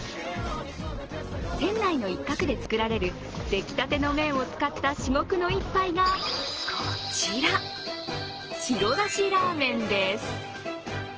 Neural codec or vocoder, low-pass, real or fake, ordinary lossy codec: none; 7.2 kHz; real; Opus, 16 kbps